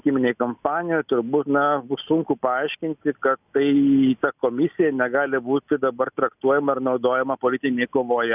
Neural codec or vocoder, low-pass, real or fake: none; 3.6 kHz; real